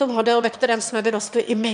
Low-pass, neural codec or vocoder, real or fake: 9.9 kHz; autoencoder, 22.05 kHz, a latent of 192 numbers a frame, VITS, trained on one speaker; fake